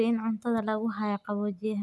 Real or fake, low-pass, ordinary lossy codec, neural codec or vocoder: real; none; none; none